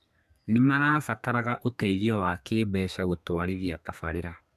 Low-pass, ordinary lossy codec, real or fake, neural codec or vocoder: 14.4 kHz; none; fake; codec, 32 kHz, 1.9 kbps, SNAC